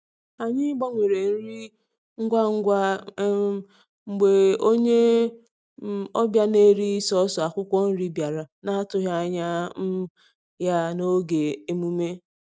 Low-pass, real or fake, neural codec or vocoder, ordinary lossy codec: none; real; none; none